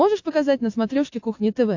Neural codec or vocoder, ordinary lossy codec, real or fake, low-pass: none; AAC, 48 kbps; real; 7.2 kHz